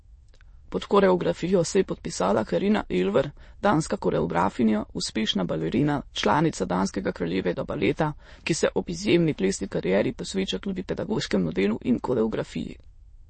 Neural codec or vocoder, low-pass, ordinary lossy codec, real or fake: autoencoder, 22.05 kHz, a latent of 192 numbers a frame, VITS, trained on many speakers; 9.9 kHz; MP3, 32 kbps; fake